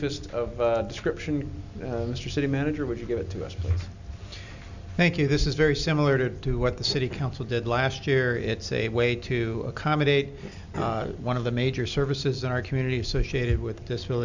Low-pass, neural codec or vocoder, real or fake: 7.2 kHz; none; real